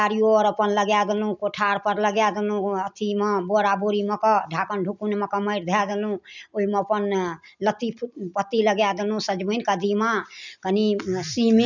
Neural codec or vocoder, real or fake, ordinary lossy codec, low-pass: none; real; none; 7.2 kHz